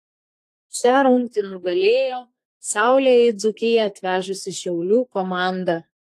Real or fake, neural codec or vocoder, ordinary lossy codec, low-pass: fake; codec, 32 kHz, 1.9 kbps, SNAC; AAC, 64 kbps; 14.4 kHz